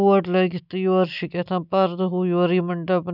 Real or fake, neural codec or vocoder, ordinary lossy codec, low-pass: real; none; none; 5.4 kHz